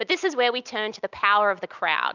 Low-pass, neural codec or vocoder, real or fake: 7.2 kHz; none; real